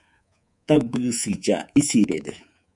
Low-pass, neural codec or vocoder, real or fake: 10.8 kHz; codec, 24 kHz, 3.1 kbps, DualCodec; fake